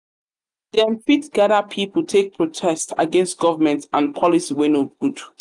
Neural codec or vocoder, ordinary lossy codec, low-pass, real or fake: none; none; 10.8 kHz; real